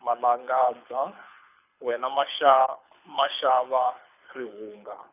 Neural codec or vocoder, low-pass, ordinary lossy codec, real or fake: codec, 24 kHz, 6 kbps, HILCodec; 3.6 kHz; none; fake